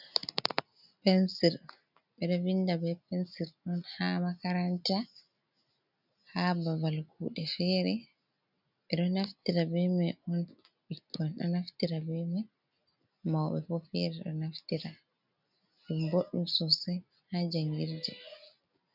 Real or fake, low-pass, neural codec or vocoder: real; 5.4 kHz; none